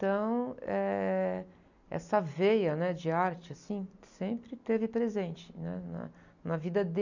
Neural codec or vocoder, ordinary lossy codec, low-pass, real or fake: none; none; 7.2 kHz; real